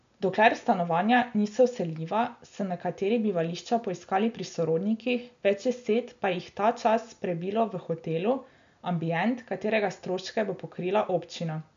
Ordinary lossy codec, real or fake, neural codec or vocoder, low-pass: MP3, 64 kbps; real; none; 7.2 kHz